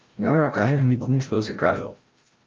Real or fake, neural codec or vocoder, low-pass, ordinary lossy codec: fake; codec, 16 kHz, 0.5 kbps, FreqCodec, larger model; 7.2 kHz; Opus, 32 kbps